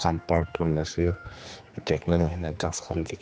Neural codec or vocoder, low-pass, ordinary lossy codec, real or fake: codec, 16 kHz, 2 kbps, X-Codec, HuBERT features, trained on general audio; none; none; fake